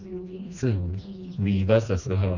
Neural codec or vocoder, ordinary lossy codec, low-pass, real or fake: codec, 16 kHz, 2 kbps, FreqCodec, smaller model; none; 7.2 kHz; fake